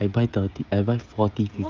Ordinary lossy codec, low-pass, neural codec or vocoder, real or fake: Opus, 24 kbps; 7.2 kHz; codec, 16 kHz, 16 kbps, FreqCodec, smaller model; fake